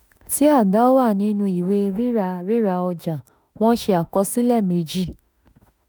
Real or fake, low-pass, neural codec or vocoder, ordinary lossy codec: fake; none; autoencoder, 48 kHz, 32 numbers a frame, DAC-VAE, trained on Japanese speech; none